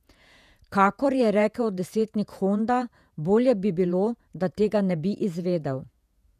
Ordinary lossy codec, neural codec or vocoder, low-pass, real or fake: none; vocoder, 48 kHz, 128 mel bands, Vocos; 14.4 kHz; fake